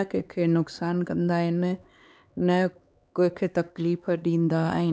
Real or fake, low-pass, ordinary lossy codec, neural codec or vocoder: fake; none; none; codec, 16 kHz, 4 kbps, X-Codec, HuBERT features, trained on LibriSpeech